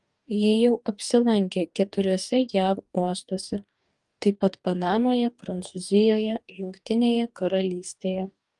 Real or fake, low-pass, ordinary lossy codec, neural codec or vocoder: fake; 10.8 kHz; Opus, 32 kbps; codec, 44.1 kHz, 2.6 kbps, DAC